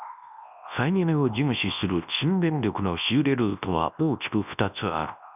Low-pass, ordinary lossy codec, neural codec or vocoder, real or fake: 3.6 kHz; none; codec, 24 kHz, 0.9 kbps, WavTokenizer, large speech release; fake